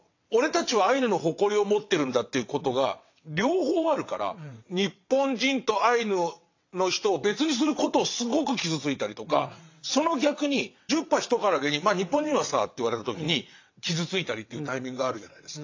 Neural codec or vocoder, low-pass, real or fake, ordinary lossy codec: vocoder, 22.05 kHz, 80 mel bands, WaveNeXt; 7.2 kHz; fake; AAC, 48 kbps